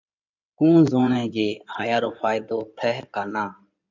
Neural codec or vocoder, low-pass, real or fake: codec, 16 kHz in and 24 kHz out, 2.2 kbps, FireRedTTS-2 codec; 7.2 kHz; fake